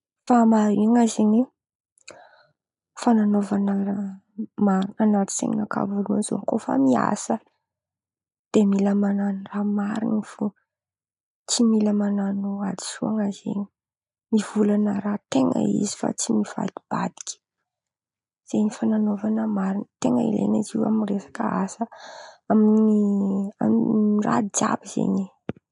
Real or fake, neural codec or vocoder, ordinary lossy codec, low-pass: real; none; none; 10.8 kHz